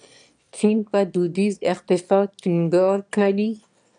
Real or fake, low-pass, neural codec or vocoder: fake; 9.9 kHz; autoencoder, 22.05 kHz, a latent of 192 numbers a frame, VITS, trained on one speaker